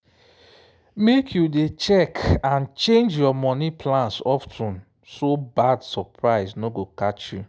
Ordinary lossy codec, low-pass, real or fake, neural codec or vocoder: none; none; real; none